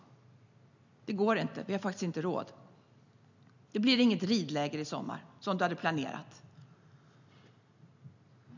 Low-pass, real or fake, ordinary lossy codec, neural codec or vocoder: 7.2 kHz; real; none; none